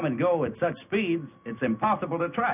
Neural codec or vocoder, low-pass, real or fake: none; 3.6 kHz; real